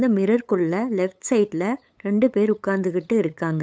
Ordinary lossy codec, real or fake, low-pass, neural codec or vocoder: none; fake; none; codec, 16 kHz, 8 kbps, FunCodec, trained on LibriTTS, 25 frames a second